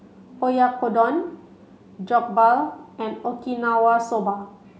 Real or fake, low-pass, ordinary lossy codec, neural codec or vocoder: real; none; none; none